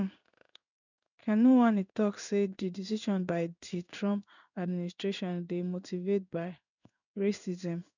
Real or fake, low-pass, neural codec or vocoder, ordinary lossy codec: fake; 7.2 kHz; codec, 16 kHz in and 24 kHz out, 1 kbps, XY-Tokenizer; none